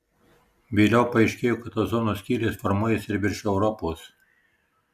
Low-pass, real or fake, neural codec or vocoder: 14.4 kHz; real; none